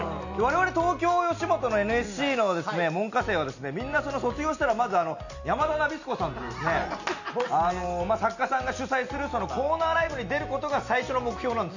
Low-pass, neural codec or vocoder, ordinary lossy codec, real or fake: 7.2 kHz; none; none; real